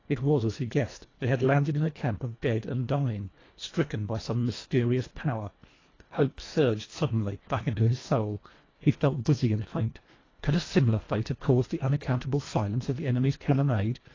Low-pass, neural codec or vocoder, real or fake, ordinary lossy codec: 7.2 kHz; codec, 24 kHz, 1.5 kbps, HILCodec; fake; AAC, 32 kbps